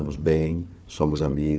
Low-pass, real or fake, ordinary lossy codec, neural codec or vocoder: none; fake; none; codec, 16 kHz, 4 kbps, FunCodec, trained on Chinese and English, 50 frames a second